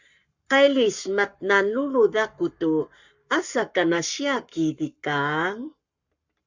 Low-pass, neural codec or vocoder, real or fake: 7.2 kHz; codec, 44.1 kHz, 7.8 kbps, Pupu-Codec; fake